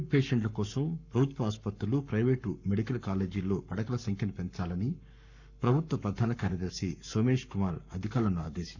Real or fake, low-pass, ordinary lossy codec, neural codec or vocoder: fake; 7.2 kHz; none; codec, 44.1 kHz, 7.8 kbps, Pupu-Codec